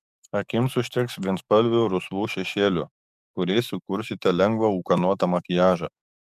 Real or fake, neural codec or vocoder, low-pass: fake; codec, 44.1 kHz, 7.8 kbps, DAC; 14.4 kHz